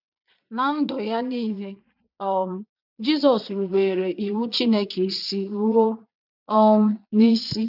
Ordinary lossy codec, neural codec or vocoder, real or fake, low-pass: none; vocoder, 22.05 kHz, 80 mel bands, Vocos; fake; 5.4 kHz